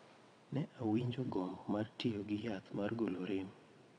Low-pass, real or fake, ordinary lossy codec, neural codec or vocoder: 9.9 kHz; fake; none; vocoder, 22.05 kHz, 80 mel bands, WaveNeXt